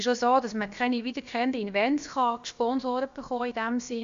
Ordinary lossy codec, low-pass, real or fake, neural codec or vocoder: AAC, 64 kbps; 7.2 kHz; fake; codec, 16 kHz, about 1 kbps, DyCAST, with the encoder's durations